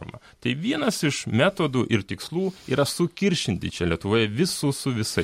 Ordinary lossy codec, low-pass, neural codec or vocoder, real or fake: MP3, 64 kbps; 19.8 kHz; none; real